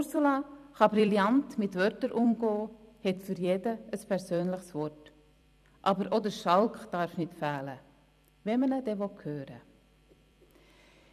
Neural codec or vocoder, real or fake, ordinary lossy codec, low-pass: vocoder, 44.1 kHz, 128 mel bands every 256 samples, BigVGAN v2; fake; none; 14.4 kHz